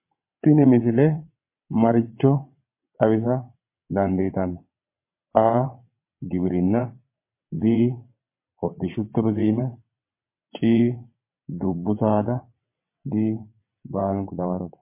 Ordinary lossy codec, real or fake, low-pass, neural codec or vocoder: MP3, 24 kbps; fake; 3.6 kHz; vocoder, 22.05 kHz, 80 mel bands, WaveNeXt